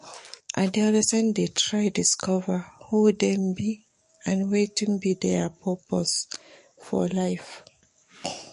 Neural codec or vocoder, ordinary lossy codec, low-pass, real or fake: codec, 44.1 kHz, 7.8 kbps, DAC; MP3, 48 kbps; 14.4 kHz; fake